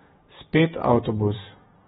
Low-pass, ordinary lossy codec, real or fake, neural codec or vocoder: 19.8 kHz; AAC, 16 kbps; fake; vocoder, 48 kHz, 128 mel bands, Vocos